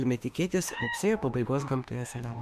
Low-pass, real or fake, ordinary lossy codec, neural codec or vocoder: 14.4 kHz; fake; MP3, 96 kbps; autoencoder, 48 kHz, 32 numbers a frame, DAC-VAE, trained on Japanese speech